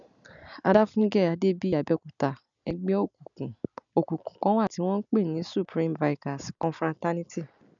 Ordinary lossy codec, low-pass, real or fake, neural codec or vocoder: none; 7.2 kHz; real; none